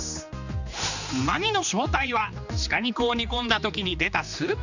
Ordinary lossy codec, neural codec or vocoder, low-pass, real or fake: none; codec, 16 kHz, 2 kbps, X-Codec, HuBERT features, trained on general audio; 7.2 kHz; fake